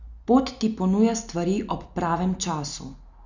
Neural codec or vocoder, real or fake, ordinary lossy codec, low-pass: none; real; Opus, 64 kbps; 7.2 kHz